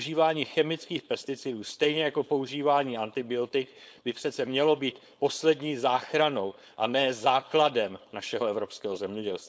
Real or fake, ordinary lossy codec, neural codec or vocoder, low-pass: fake; none; codec, 16 kHz, 4.8 kbps, FACodec; none